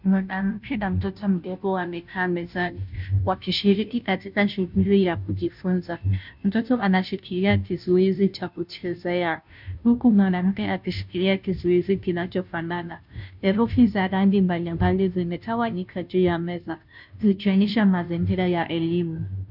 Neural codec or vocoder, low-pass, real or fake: codec, 16 kHz, 0.5 kbps, FunCodec, trained on Chinese and English, 25 frames a second; 5.4 kHz; fake